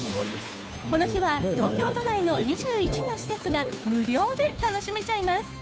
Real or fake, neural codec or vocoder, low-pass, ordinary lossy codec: fake; codec, 16 kHz, 2 kbps, FunCodec, trained on Chinese and English, 25 frames a second; none; none